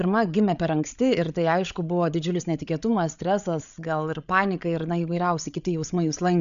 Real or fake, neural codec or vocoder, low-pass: fake; codec, 16 kHz, 8 kbps, FreqCodec, larger model; 7.2 kHz